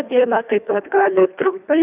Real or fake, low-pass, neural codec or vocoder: fake; 3.6 kHz; codec, 24 kHz, 1.5 kbps, HILCodec